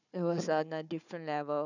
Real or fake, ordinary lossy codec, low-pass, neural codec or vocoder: fake; none; 7.2 kHz; codec, 16 kHz, 4 kbps, FunCodec, trained on Chinese and English, 50 frames a second